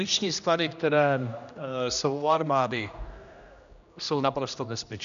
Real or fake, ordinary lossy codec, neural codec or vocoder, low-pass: fake; MP3, 96 kbps; codec, 16 kHz, 1 kbps, X-Codec, HuBERT features, trained on general audio; 7.2 kHz